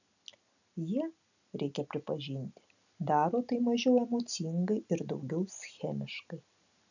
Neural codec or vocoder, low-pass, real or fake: none; 7.2 kHz; real